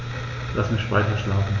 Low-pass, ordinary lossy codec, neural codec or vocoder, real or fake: 7.2 kHz; Opus, 64 kbps; none; real